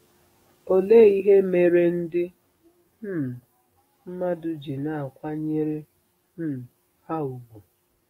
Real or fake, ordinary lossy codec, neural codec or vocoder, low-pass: fake; AAC, 48 kbps; codec, 44.1 kHz, 7.8 kbps, DAC; 19.8 kHz